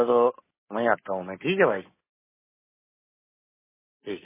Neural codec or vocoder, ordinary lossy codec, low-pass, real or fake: none; MP3, 16 kbps; 3.6 kHz; real